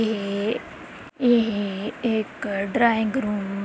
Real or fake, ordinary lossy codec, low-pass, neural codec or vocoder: real; none; none; none